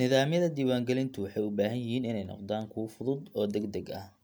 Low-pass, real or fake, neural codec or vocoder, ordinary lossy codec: none; real; none; none